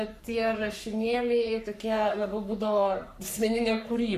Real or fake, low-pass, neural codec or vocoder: fake; 14.4 kHz; codec, 44.1 kHz, 3.4 kbps, Pupu-Codec